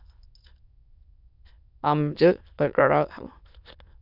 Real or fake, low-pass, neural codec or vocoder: fake; 5.4 kHz; autoencoder, 22.05 kHz, a latent of 192 numbers a frame, VITS, trained on many speakers